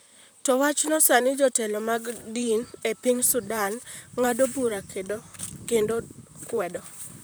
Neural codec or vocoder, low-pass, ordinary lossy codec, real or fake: vocoder, 44.1 kHz, 128 mel bands, Pupu-Vocoder; none; none; fake